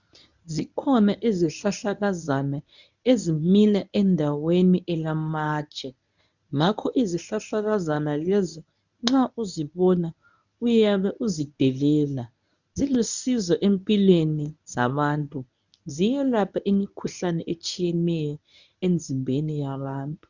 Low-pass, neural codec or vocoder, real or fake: 7.2 kHz; codec, 24 kHz, 0.9 kbps, WavTokenizer, medium speech release version 1; fake